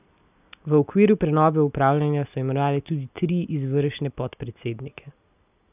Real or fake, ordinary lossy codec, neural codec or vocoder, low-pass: real; none; none; 3.6 kHz